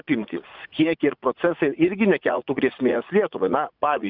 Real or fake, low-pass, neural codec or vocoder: fake; 5.4 kHz; vocoder, 44.1 kHz, 80 mel bands, Vocos